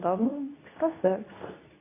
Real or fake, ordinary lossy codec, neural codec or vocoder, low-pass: fake; AAC, 16 kbps; codec, 24 kHz, 0.9 kbps, WavTokenizer, medium speech release version 2; 3.6 kHz